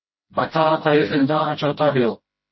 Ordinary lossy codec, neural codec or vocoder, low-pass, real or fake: MP3, 24 kbps; codec, 16 kHz, 0.5 kbps, FreqCodec, smaller model; 7.2 kHz; fake